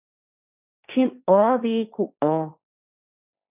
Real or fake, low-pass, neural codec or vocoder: fake; 3.6 kHz; codec, 16 kHz, 1.1 kbps, Voila-Tokenizer